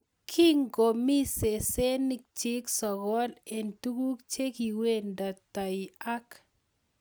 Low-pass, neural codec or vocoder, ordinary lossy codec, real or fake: none; none; none; real